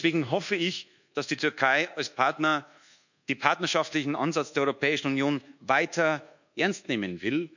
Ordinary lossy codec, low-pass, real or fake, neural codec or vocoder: none; 7.2 kHz; fake; codec, 24 kHz, 1.2 kbps, DualCodec